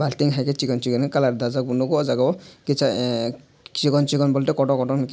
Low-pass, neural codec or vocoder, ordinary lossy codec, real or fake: none; none; none; real